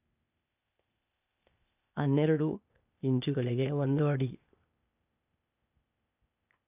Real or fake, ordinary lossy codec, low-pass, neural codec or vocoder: fake; none; 3.6 kHz; codec, 16 kHz, 0.8 kbps, ZipCodec